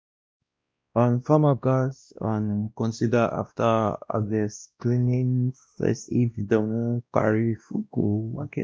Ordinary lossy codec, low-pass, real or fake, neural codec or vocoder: none; none; fake; codec, 16 kHz, 1 kbps, X-Codec, WavLM features, trained on Multilingual LibriSpeech